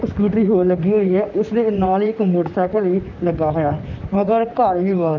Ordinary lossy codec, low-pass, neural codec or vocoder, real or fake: none; 7.2 kHz; vocoder, 44.1 kHz, 128 mel bands, Pupu-Vocoder; fake